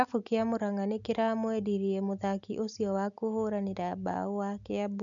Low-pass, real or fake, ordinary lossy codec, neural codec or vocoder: 7.2 kHz; real; MP3, 96 kbps; none